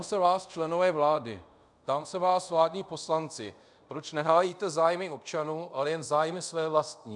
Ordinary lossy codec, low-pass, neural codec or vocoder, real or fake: MP3, 96 kbps; 10.8 kHz; codec, 24 kHz, 0.5 kbps, DualCodec; fake